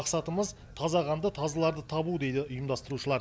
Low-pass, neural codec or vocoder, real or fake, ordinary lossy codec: none; none; real; none